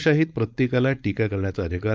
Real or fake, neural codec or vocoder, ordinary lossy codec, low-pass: fake; codec, 16 kHz, 4.8 kbps, FACodec; none; none